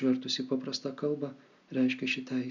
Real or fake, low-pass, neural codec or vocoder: real; 7.2 kHz; none